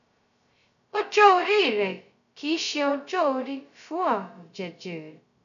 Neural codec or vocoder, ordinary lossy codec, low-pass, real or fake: codec, 16 kHz, 0.2 kbps, FocalCodec; none; 7.2 kHz; fake